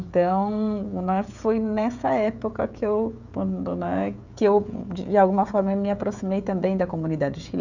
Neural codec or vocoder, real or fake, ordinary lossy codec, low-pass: codec, 16 kHz, 16 kbps, FreqCodec, smaller model; fake; none; 7.2 kHz